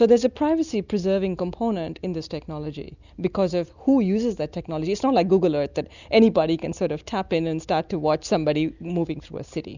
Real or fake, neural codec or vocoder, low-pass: real; none; 7.2 kHz